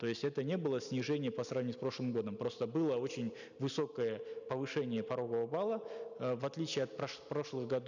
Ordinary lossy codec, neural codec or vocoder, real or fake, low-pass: none; none; real; 7.2 kHz